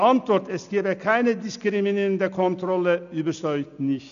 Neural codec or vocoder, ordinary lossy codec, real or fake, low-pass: none; none; real; 7.2 kHz